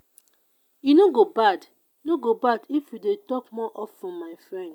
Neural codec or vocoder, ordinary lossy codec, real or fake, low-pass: none; none; real; 19.8 kHz